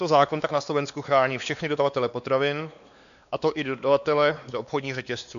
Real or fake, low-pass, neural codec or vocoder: fake; 7.2 kHz; codec, 16 kHz, 2 kbps, X-Codec, WavLM features, trained on Multilingual LibriSpeech